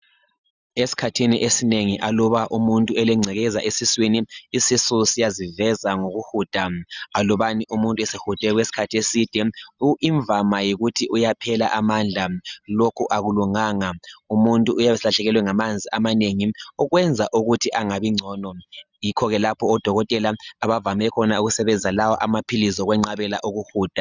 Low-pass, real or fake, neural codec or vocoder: 7.2 kHz; real; none